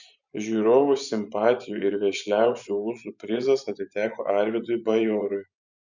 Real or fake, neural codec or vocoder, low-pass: fake; vocoder, 24 kHz, 100 mel bands, Vocos; 7.2 kHz